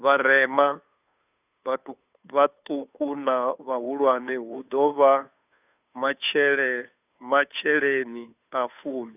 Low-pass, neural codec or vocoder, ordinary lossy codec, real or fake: 3.6 kHz; codec, 16 kHz, 2 kbps, FunCodec, trained on Chinese and English, 25 frames a second; none; fake